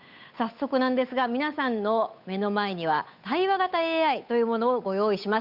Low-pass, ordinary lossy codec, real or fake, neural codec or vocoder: 5.4 kHz; none; fake; codec, 16 kHz, 8 kbps, FunCodec, trained on Chinese and English, 25 frames a second